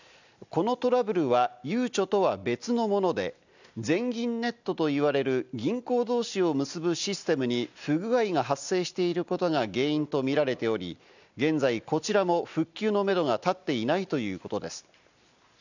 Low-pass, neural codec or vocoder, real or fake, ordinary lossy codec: 7.2 kHz; none; real; none